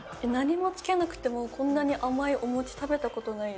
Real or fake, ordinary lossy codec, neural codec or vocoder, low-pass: real; none; none; none